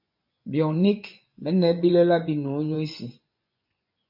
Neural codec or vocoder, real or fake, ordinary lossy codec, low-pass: vocoder, 24 kHz, 100 mel bands, Vocos; fake; MP3, 48 kbps; 5.4 kHz